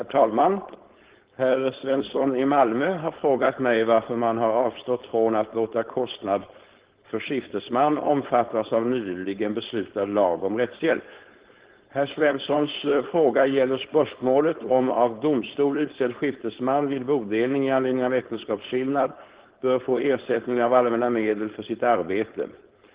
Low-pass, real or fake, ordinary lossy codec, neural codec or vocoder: 3.6 kHz; fake; Opus, 16 kbps; codec, 16 kHz, 4.8 kbps, FACodec